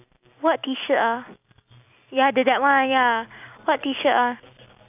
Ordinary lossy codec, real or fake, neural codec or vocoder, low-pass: none; real; none; 3.6 kHz